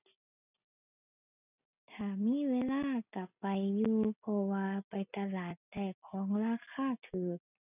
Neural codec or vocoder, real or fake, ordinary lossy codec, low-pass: none; real; none; 3.6 kHz